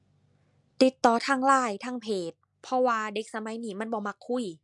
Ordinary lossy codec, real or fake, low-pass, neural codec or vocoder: MP3, 64 kbps; real; 10.8 kHz; none